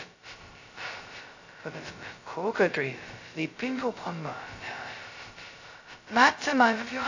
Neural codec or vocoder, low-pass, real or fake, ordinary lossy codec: codec, 16 kHz, 0.2 kbps, FocalCodec; 7.2 kHz; fake; AAC, 48 kbps